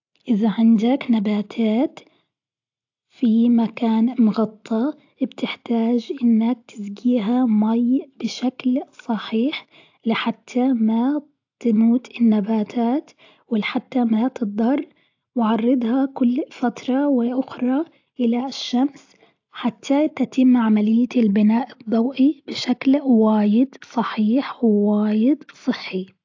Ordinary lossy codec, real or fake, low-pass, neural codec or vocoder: AAC, 48 kbps; real; 7.2 kHz; none